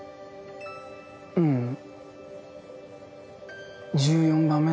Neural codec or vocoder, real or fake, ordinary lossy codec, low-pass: none; real; none; none